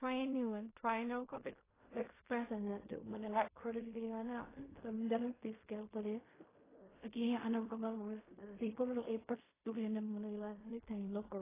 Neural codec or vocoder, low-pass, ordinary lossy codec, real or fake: codec, 16 kHz in and 24 kHz out, 0.4 kbps, LongCat-Audio-Codec, fine tuned four codebook decoder; 3.6 kHz; AAC, 16 kbps; fake